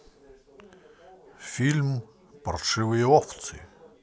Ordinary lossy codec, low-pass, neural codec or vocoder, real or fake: none; none; none; real